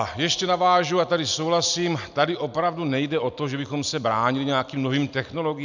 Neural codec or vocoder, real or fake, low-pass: none; real; 7.2 kHz